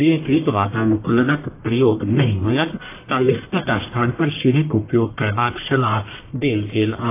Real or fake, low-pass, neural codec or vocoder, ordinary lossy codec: fake; 3.6 kHz; codec, 44.1 kHz, 1.7 kbps, Pupu-Codec; AAC, 24 kbps